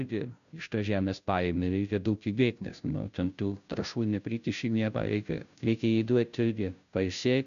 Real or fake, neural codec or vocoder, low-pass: fake; codec, 16 kHz, 0.5 kbps, FunCodec, trained on Chinese and English, 25 frames a second; 7.2 kHz